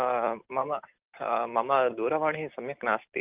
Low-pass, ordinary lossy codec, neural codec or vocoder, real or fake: 3.6 kHz; Opus, 24 kbps; none; real